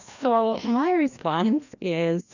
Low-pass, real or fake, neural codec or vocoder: 7.2 kHz; fake; codec, 16 kHz, 1 kbps, FreqCodec, larger model